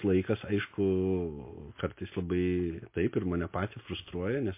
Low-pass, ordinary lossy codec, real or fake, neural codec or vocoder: 3.6 kHz; MP3, 24 kbps; real; none